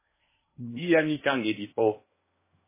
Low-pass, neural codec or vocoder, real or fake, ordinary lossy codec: 3.6 kHz; codec, 16 kHz in and 24 kHz out, 0.8 kbps, FocalCodec, streaming, 65536 codes; fake; MP3, 16 kbps